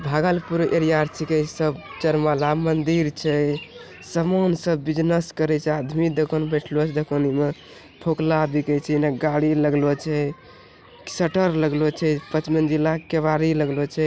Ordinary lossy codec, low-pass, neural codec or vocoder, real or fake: none; none; none; real